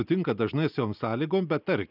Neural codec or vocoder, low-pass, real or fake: none; 5.4 kHz; real